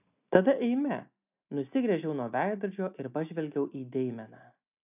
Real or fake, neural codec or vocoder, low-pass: real; none; 3.6 kHz